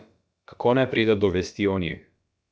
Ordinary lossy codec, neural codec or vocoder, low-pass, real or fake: none; codec, 16 kHz, about 1 kbps, DyCAST, with the encoder's durations; none; fake